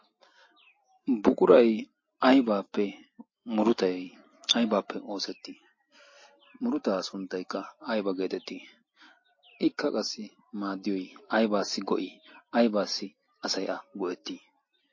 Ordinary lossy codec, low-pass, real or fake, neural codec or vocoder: MP3, 32 kbps; 7.2 kHz; real; none